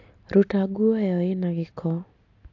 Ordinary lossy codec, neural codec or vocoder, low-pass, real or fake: AAC, 48 kbps; none; 7.2 kHz; real